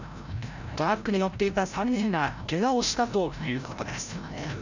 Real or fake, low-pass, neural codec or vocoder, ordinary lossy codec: fake; 7.2 kHz; codec, 16 kHz, 0.5 kbps, FreqCodec, larger model; none